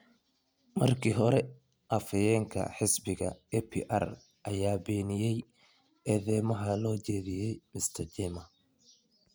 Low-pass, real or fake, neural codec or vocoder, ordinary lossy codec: none; real; none; none